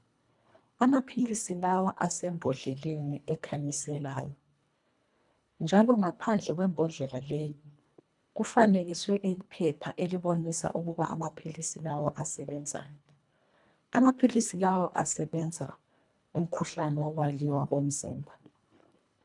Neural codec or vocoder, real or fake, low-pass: codec, 24 kHz, 1.5 kbps, HILCodec; fake; 10.8 kHz